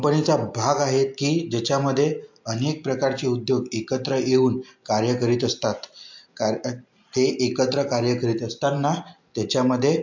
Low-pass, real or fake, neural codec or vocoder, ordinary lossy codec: 7.2 kHz; real; none; MP3, 48 kbps